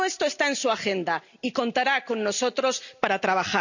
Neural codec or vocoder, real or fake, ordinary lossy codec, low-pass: none; real; none; 7.2 kHz